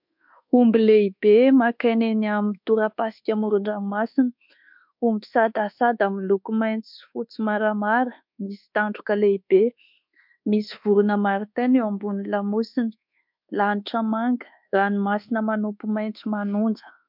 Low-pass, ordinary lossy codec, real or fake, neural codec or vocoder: 5.4 kHz; MP3, 48 kbps; fake; codec, 24 kHz, 1.2 kbps, DualCodec